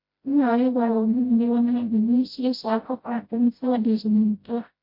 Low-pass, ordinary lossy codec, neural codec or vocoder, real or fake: 5.4 kHz; MP3, 32 kbps; codec, 16 kHz, 0.5 kbps, FreqCodec, smaller model; fake